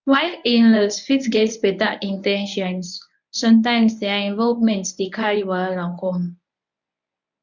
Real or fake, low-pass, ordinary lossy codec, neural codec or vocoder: fake; 7.2 kHz; none; codec, 24 kHz, 0.9 kbps, WavTokenizer, medium speech release version 1